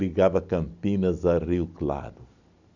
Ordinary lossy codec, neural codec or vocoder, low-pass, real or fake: none; none; 7.2 kHz; real